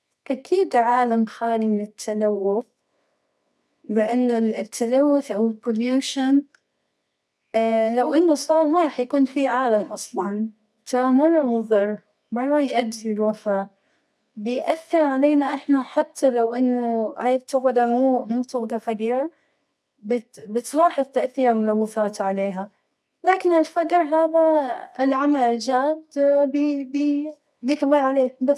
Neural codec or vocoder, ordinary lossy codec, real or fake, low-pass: codec, 24 kHz, 0.9 kbps, WavTokenizer, medium music audio release; none; fake; none